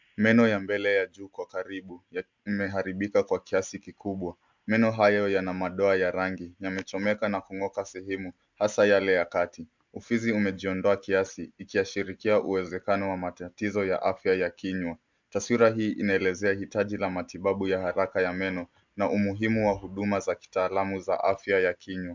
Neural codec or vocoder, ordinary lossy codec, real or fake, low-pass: none; MP3, 64 kbps; real; 7.2 kHz